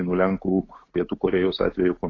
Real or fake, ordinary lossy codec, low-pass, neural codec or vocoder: real; AAC, 32 kbps; 7.2 kHz; none